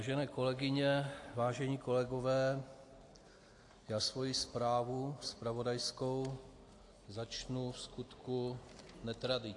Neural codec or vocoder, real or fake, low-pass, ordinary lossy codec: none; real; 10.8 kHz; AAC, 48 kbps